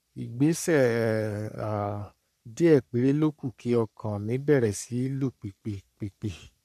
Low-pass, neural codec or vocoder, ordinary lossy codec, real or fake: 14.4 kHz; codec, 44.1 kHz, 3.4 kbps, Pupu-Codec; none; fake